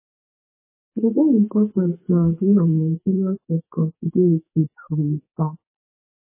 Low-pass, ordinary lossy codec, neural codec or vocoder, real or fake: 3.6 kHz; MP3, 16 kbps; codec, 44.1 kHz, 2.6 kbps, SNAC; fake